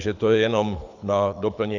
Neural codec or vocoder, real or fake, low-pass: codec, 24 kHz, 6 kbps, HILCodec; fake; 7.2 kHz